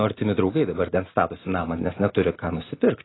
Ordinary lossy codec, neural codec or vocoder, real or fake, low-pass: AAC, 16 kbps; none; real; 7.2 kHz